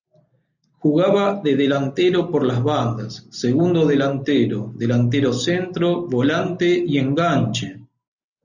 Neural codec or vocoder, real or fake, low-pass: none; real; 7.2 kHz